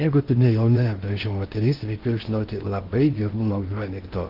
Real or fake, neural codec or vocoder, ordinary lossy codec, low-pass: fake; codec, 16 kHz in and 24 kHz out, 0.6 kbps, FocalCodec, streaming, 2048 codes; Opus, 16 kbps; 5.4 kHz